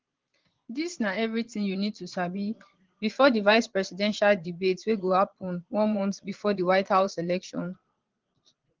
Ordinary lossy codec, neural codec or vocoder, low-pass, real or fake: Opus, 16 kbps; vocoder, 22.05 kHz, 80 mel bands, Vocos; 7.2 kHz; fake